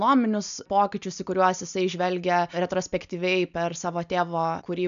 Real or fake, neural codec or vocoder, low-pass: real; none; 7.2 kHz